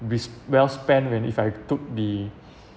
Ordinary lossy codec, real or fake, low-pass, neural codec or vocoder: none; real; none; none